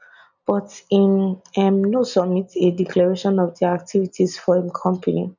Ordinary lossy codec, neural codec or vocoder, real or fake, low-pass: none; none; real; 7.2 kHz